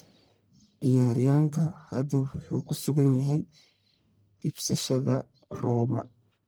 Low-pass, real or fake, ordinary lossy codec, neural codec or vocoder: none; fake; none; codec, 44.1 kHz, 1.7 kbps, Pupu-Codec